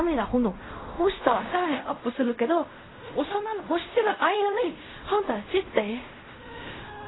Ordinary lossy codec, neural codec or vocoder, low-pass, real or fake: AAC, 16 kbps; codec, 16 kHz in and 24 kHz out, 0.4 kbps, LongCat-Audio-Codec, fine tuned four codebook decoder; 7.2 kHz; fake